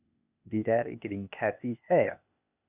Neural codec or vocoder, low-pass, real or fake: codec, 16 kHz, 0.8 kbps, ZipCodec; 3.6 kHz; fake